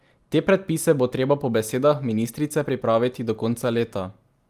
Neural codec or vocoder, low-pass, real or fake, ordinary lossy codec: none; 14.4 kHz; real; Opus, 32 kbps